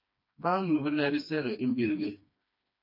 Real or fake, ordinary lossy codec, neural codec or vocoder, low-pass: fake; MP3, 32 kbps; codec, 16 kHz, 2 kbps, FreqCodec, smaller model; 5.4 kHz